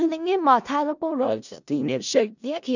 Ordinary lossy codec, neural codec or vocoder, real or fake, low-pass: none; codec, 16 kHz in and 24 kHz out, 0.4 kbps, LongCat-Audio-Codec, four codebook decoder; fake; 7.2 kHz